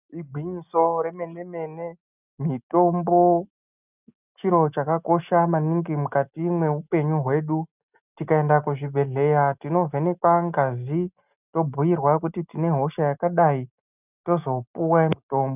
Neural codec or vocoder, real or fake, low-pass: none; real; 3.6 kHz